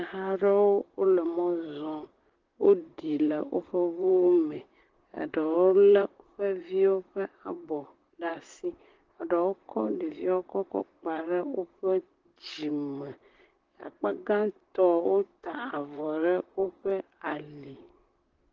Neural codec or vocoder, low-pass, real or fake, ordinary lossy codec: vocoder, 44.1 kHz, 128 mel bands, Pupu-Vocoder; 7.2 kHz; fake; Opus, 16 kbps